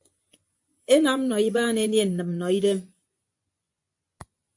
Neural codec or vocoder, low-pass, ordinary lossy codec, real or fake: vocoder, 24 kHz, 100 mel bands, Vocos; 10.8 kHz; AAC, 64 kbps; fake